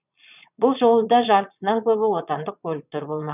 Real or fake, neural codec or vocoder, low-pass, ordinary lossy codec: fake; vocoder, 22.05 kHz, 80 mel bands, Vocos; 3.6 kHz; none